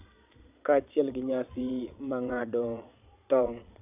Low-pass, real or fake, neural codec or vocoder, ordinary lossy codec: 3.6 kHz; fake; vocoder, 22.05 kHz, 80 mel bands, Vocos; AAC, 32 kbps